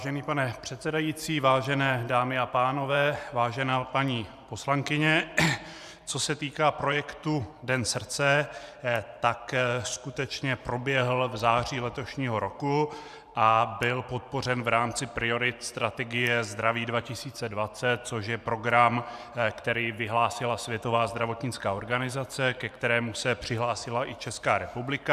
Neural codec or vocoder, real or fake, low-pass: vocoder, 44.1 kHz, 128 mel bands every 512 samples, BigVGAN v2; fake; 14.4 kHz